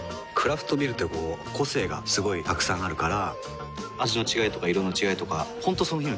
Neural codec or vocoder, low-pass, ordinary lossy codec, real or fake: none; none; none; real